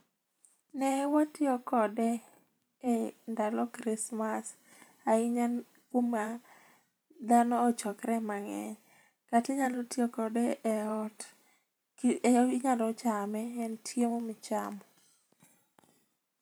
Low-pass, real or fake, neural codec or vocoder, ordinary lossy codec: none; fake; vocoder, 44.1 kHz, 128 mel bands every 512 samples, BigVGAN v2; none